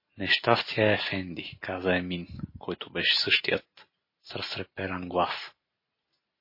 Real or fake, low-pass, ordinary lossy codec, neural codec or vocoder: real; 5.4 kHz; MP3, 24 kbps; none